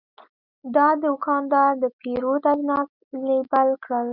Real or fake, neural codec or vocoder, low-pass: real; none; 5.4 kHz